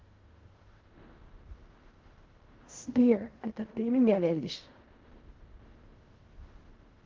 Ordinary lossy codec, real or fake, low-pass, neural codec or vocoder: Opus, 16 kbps; fake; 7.2 kHz; codec, 16 kHz in and 24 kHz out, 0.4 kbps, LongCat-Audio-Codec, fine tuned four codebook decoder